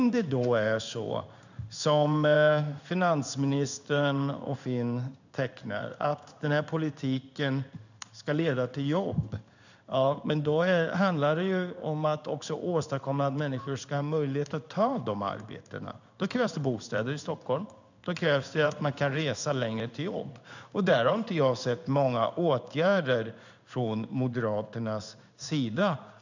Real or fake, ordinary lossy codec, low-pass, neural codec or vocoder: fake; none; 7.2 kHz; codec, 16 kHz in and 24 kHz out, 1 kbps, XY-Tokenizer